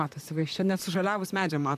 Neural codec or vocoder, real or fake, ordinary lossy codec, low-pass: vocoder, 44.1 kHz, 128 mel bands, Pupu-Vocoder; fake; MP3, 96 kbps; 14.4 kHz